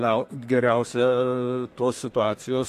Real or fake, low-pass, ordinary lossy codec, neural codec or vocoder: fake; 14.4 kHz; AAC, 64 kbps; codec, 32 kHz, 1.9 kbps, SNAC